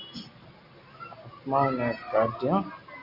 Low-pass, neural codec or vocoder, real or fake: 5.4 kHz; none; real